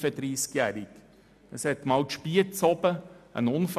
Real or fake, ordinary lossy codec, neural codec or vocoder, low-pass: real; none; none; 14.4 kHz